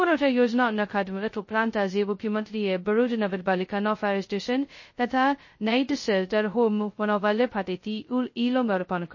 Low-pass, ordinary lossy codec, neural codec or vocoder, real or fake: 7.2 kHz; MP3, 32 kbps; codec, 16 kHz, 0.2 kbps, FocalCodec; fake